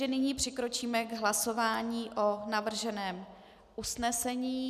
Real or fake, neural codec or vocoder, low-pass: real; none; 14.4 kHz